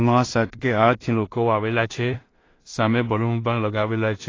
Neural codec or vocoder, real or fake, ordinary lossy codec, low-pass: codec, 16 kHz in and 24 kHz out, 0.4 kbps, LongCat-Audio-Codec, two codebook decoder; fake; AAC, 32 kbps; 7.2 kHz